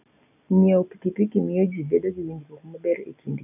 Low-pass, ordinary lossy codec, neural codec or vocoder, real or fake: 3.6 kHz; none; none; real